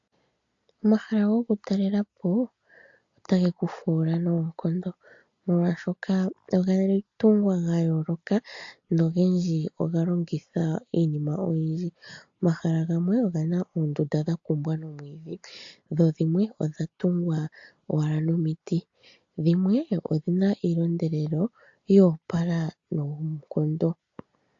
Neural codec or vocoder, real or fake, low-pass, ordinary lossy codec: none; real; 7.2 kHz; AAC, 48 kbps